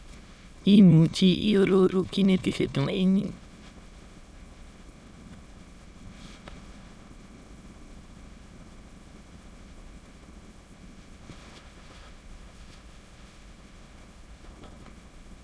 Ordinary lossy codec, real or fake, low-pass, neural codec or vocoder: none; fake; none; autoencoder, 22.05 kHz, a latent of 192 numbers a frame, VITS, trained on many speakers